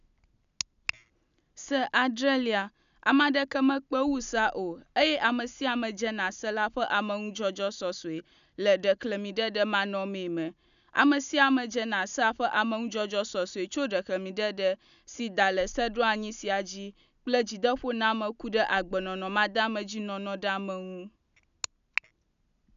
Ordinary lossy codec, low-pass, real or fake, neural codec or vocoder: none; 7.2 kHz; real; none